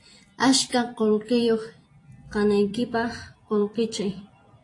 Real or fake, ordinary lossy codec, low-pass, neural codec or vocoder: fake; AAC, 48 kbps; 10.8 kHz; vocoder, 44.1 kHz, 128 mel bands every 256 samples, BigVGAN v2